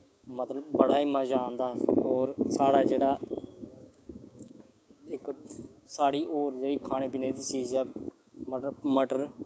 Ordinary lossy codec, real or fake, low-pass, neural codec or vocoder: none; fake; none; codec, 16 kHz, 6 kbps, DAC